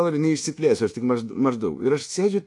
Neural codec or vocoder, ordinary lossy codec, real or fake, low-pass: codec, 24 kHz, 1.2 kbps, DualCodec; AAC, 48 kbps; fake; 10.8 kHz